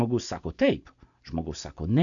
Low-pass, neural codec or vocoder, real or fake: 7.2 kHz; none; real